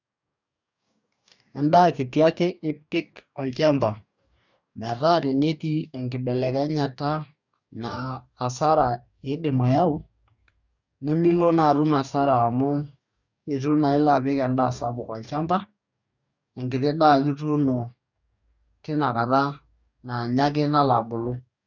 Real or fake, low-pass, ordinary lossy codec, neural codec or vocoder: fake; 7.2 kHz; none; codec, 44.1 kHz, 2.6 kbps, DAC